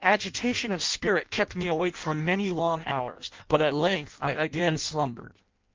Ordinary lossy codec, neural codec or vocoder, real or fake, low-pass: Opus, 32 kbps; codec, 16 kHz in and 24 kHz out, 0.6 kbps, FireRedTTS-2 codec; fake; 7.2 kHz